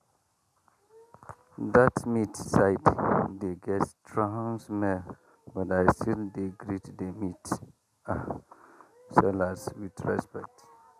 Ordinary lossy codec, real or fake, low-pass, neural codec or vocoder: none; real; 14.4 kHz; none